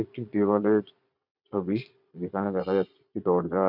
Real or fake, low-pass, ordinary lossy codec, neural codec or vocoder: fake; 5.4 kHz; none; vocoder, 22.05 kHz, 80 mel bands, Vocos